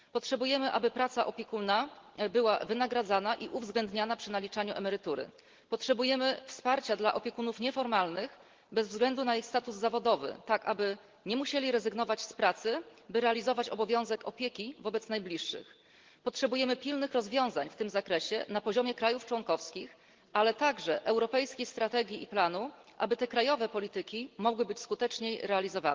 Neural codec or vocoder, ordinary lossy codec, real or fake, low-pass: none; Opus, 16 kbps; real; 7.2 kHz